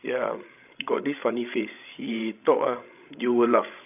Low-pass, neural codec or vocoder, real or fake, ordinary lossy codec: 3.6 kHz; codec, 16 kHz, 16 kbps, FreqCodec, larger model; fake; none